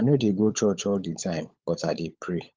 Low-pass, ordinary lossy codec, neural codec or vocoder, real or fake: 7.2 kHz; Opus, 32 kbps; codec, 16 kHz, 16 kbps, FunCodec, trained on LibriTTS, 50 frames a second; fake